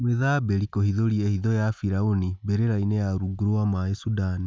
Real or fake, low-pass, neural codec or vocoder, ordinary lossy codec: real; none; none; none